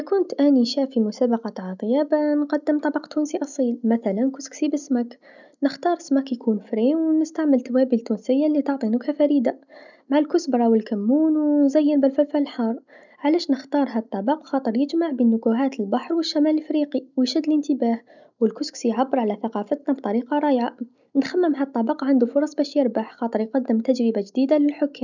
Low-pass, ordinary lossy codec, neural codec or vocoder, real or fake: 7.2 kHz; none; none; real